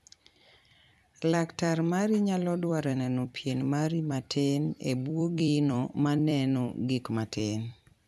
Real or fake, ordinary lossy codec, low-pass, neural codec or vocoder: fake; none; 14.4 kHz; vocoder, 44.1 kHz, 128 mel bands every 256 samples, BigVGAN v2